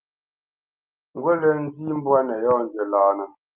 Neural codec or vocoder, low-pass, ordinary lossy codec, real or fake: none; 3.6 kHz; Opus, 32 kbps; real